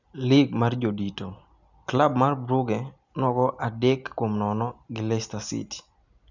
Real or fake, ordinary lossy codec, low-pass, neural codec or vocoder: real; none; 7.2 kHz; none